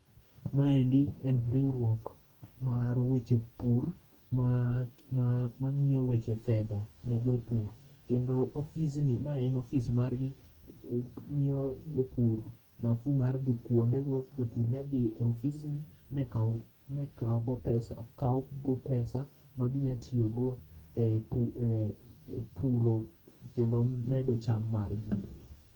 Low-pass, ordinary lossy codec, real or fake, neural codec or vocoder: 19.8 kHz; Opus, 32 kbps; fake; codec, 44.1 kHz, 2.6 kbps, DAC